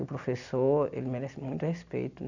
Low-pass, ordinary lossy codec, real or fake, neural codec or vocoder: 7.2 kHz; none; real; none